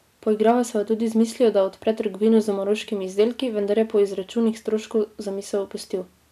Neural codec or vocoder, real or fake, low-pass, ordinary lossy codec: none; real; 14.4 kHz; none